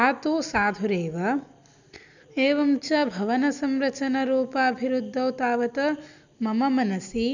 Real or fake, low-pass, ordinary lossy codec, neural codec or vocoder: real; 7.2 kHz; none; none